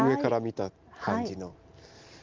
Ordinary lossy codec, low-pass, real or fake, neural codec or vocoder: Opus, 32 kbps; 7.2 kHz; real; none